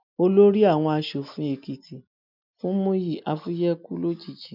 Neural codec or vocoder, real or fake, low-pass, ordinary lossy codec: none; real; 5.4 kHz; none